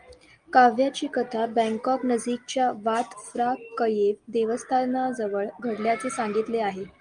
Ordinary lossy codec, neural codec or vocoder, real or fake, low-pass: Opus, 32 kbps; none; real; 9.9 kHz